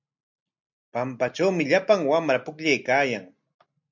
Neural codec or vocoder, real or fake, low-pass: none; real; 7.2 kHz